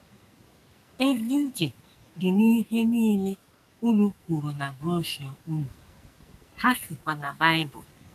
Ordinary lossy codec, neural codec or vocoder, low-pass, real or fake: none; codec, 44.1 kHz, 2.6 kbps, SNAC; 14.4 kHz; fake